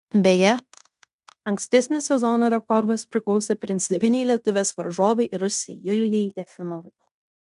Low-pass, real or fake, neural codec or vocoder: 10.8 kHz; fake; codec, 16 kHz in and 24 kHz out, 0.9 kbps, LongCat-Audio-Codec, fine tuned four codebook decoder